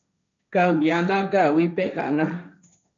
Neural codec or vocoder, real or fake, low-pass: codec, 16 kHz, 1.1 kbps, Voila-Tokenizer; fake; 7.2 kHz